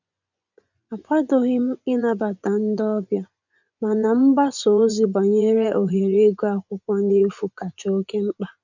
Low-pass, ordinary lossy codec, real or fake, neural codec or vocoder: 7.2 kHz; none; fake; vocoder, 24 kHz, 100 mel bands, Vocos